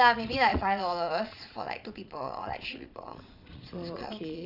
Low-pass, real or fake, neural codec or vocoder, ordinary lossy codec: 5.4 kHz; fake; vocoder, 22.05 kHz, 80 mel bands, Vocos; none